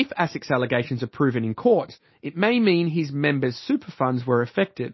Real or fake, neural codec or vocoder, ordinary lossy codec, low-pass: real; none; MP3, 24 kbps; 7.2 kHz